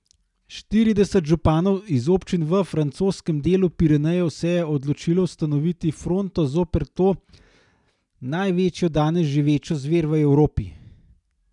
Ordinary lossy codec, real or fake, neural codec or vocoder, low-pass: none; real; none; 10.8 kHz